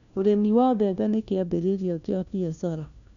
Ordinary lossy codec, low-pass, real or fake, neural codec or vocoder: none; 7.2 kHz; fake; codec, 16 kHz, 1 kbps, FunCodec, trained on LibriTTS, 50 frames a second